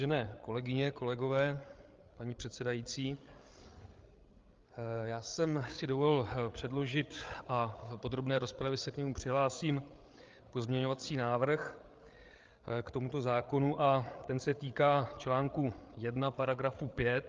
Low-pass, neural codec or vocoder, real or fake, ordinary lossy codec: 7.2 kHz; codec, 16 kHz, 8 kbps, FreqCodec, larger model; fake; Opus, 32 kbps